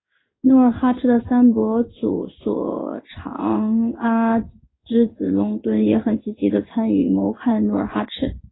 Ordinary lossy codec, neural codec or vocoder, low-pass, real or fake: AAC, 16 kbps; codec, 16 kHz in and 24 kHz out, 1 kbps, XY-Tokenizer; 7.2 kHz; fake